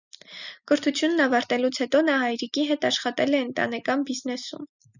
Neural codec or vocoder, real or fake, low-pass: none; real; 7.2 kHz